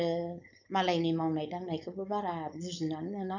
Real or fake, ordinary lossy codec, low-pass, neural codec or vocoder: fake; none; 7.2 kHz; codec, 16 kHz, 4.8 kbps, FACodec